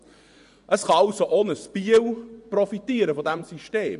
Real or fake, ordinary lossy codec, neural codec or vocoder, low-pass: fake; none; vocoder, 24 kHz, 100 mel bands, Vocos; 10.8 kHz